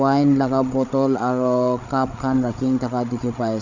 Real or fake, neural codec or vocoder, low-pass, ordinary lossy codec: fake; codec, 16 kHz, 8 kbps, FreqCodec, larger model; 7.2 kHz; none